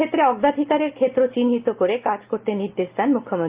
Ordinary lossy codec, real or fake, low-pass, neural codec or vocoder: Opus, 32 kbps; real; 3.6 kHz; none